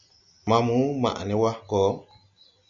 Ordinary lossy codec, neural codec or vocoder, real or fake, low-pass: MP3, 64 kbps; none; real; 7.2 kHz